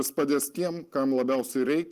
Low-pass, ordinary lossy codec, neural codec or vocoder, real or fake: 14.4 kHz; Opus, 24 kbps; none; real